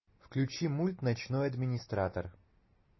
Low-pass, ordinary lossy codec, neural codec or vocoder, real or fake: 7.2 kHz; MP3, 24 kbps; none; real